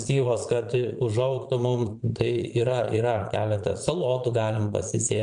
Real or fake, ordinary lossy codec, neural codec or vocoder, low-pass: fake; MP3, 64 kbps; vocoder, 22.05 kHz, 80 mel bands, Vocos; 9.9 kHz